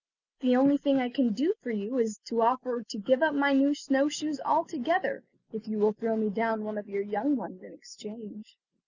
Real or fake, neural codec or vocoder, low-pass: real; none; 7.2 kHz